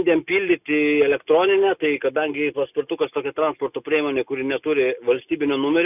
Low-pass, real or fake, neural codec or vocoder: 3.6 kHz; real; none